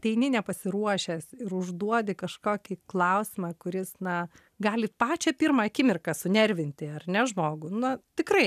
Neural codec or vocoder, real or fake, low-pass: none; real; 14.4 kHz